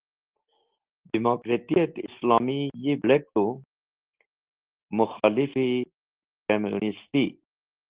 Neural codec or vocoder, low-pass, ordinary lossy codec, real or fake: none; 3.6 kHz; Opus, 16 kbps; real